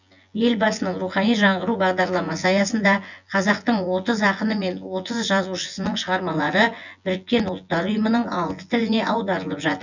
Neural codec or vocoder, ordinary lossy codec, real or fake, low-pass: vocoder, 24 kHz, 100 mel bands, Vocos; none; fake; 7.2 kHz